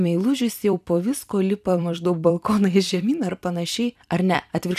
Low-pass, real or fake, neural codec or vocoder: 14.4 kHz; fake; vocoder, 44.1 kHz, 128 mel bands every 256 samples, BigVGAN v2